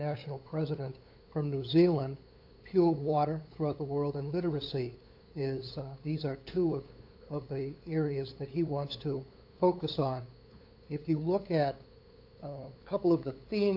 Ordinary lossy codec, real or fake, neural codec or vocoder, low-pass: AAC, 32 kbps; fake; codec, 16 kHz, 8 kbps, FunCodec, trained on LibriTTS, 25 frames a second; 5.4 kHz